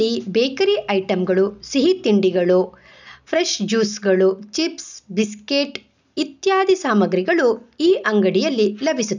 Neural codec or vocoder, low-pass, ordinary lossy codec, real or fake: none; 7.2 kHz; none; real